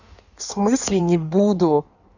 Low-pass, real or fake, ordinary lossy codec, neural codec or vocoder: 7.2 kHz; fake; none; codec, 16 kHz in and 24 kHz out, 1.1 kbps, FireRedTTS-2 codec